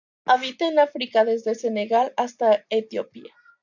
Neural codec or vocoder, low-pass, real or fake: none; 7.2 kHz; real